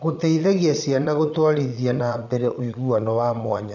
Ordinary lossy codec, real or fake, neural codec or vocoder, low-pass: none; fake; vocoder, 22.05 kHz, 80 mel bands, Vocos; 7.2 kHz